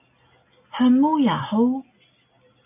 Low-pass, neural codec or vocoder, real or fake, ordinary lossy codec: 3.6 kHz; none; real; MP3, 32 kbps